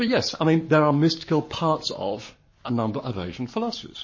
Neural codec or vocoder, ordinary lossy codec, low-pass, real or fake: codec, 44.1 kHz, 7.8 kbps, Pupu-Codec; MP3, 32 kbps; 7.2 kHz; fake